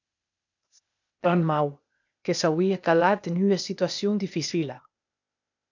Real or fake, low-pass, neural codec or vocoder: fake; 7.2 kHz; codec, 16 kHz, 0.8 kbps, ZipCodec